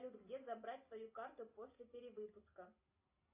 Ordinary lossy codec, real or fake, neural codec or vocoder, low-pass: AAC, 32 kbps; real; none; 3.6 kHz